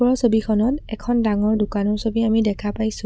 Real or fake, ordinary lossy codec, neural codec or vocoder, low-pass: real; none; none; none